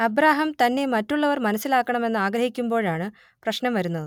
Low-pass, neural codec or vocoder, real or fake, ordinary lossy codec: 19.8 kHz; none; real; none